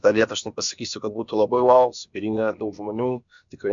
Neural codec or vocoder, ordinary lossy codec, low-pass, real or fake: codec, 16 kHz, about 1 kbps, DyCAST, with the encoder's durations; MP3, 64 kbps; 7.2 kHz; fake